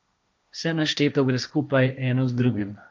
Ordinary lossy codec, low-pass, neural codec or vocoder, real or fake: none; none; codec, 16 kHz, 1.1 kbps, Voila-Tokenizer; fake